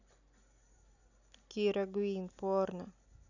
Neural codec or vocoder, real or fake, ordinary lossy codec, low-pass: none; real; none; 7.2 kHz